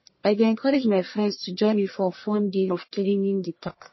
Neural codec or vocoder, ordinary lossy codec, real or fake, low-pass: codec, 44.1 kHz, 1.7 kbps, Pupu-Codec; MP3, 24 kbps; fake; 7.2 kHz